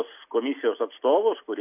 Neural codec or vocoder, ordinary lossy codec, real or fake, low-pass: none; AAC, 32 kbps; real; 3.6 kHz